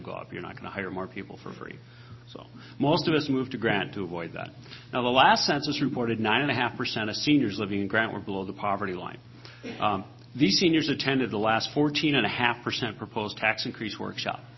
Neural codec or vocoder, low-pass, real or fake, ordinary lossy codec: none; 7.2 kHz; real; MP3, 24 kbps